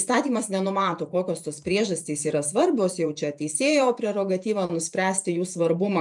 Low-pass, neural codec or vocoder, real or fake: 10.8 kHz; vocoder, 48 kHz, 128 mel bands, Vocos; fake